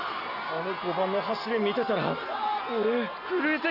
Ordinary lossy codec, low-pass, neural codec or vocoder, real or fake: none; 5.4 kHz; none; real